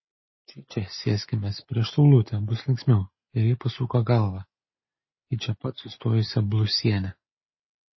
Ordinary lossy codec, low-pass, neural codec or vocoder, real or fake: MP3, 24 kbps; 7.2 kHz; none; real